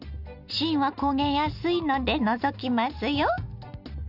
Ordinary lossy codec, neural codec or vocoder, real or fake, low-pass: none; none; real; 5.4 kHz